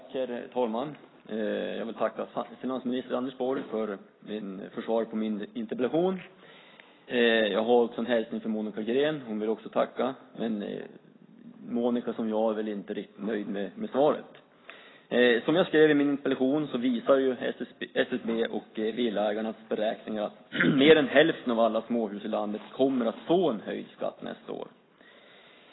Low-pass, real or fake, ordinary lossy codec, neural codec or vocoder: 7.2 kHz; real; AAC, 16 kbps; none